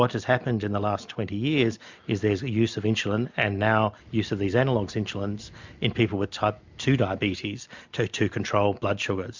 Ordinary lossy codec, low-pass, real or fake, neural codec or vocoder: MP3, 64 kbps; 7.2 kHz; real; none